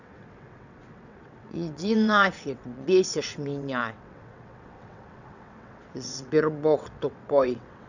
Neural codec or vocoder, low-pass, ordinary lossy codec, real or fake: vocoder, 22.05 kHz, 80 mel bands, WaveNeXt; 7.2 kHz; none; fake